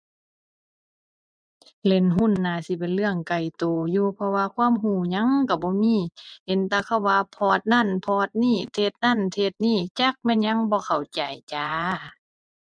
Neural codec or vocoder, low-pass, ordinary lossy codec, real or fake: vocoder, 24 kHz, 100 mel bands, Vocos; 9.9 kHz; MP3, 96 kbps; fake